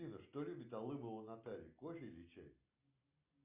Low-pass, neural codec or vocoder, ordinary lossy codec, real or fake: 3.6 kHz; none; Opus, 64 kbps; real